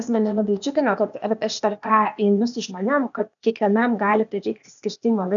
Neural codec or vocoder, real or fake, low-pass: codec, 16 kHz, 0.8 kbps, ZipCodec; fake; 7.2 kHz